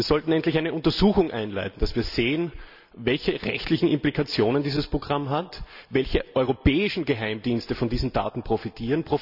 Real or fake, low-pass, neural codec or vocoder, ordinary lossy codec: real; 5.4 kHz; none; none